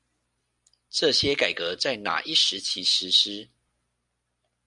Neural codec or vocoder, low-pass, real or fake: none; 10.8 kHz; real